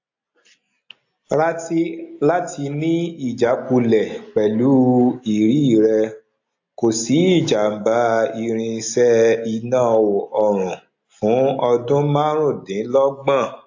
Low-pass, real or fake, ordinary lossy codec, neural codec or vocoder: 7.2 kHz; real; AAC, 48 kbps; none